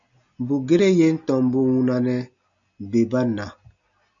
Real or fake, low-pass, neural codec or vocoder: real; 7.2 kHz; none